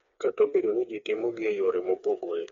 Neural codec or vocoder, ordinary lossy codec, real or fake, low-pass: codec, 16 kHz, 4 kbps, FreqCodec, smaller model; MP3, 48 kbps; fake; 7.2 kHz